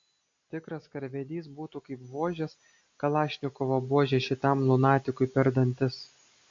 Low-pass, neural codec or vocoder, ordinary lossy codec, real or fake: 7.2 kHz; none; MP3, 48 kbps; real